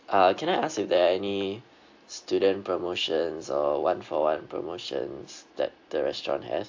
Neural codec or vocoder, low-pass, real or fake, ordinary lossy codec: none; 7.2 kHz; real; AAC, 48 kbps